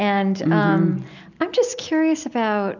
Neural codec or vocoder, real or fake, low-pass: none; real; 7.2 kHz